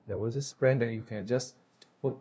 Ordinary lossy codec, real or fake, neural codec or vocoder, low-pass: none; fake; codec, 16 kHz, 0.5 kbps, FunCodec, trained on LibriTTS, 25 frames a second; none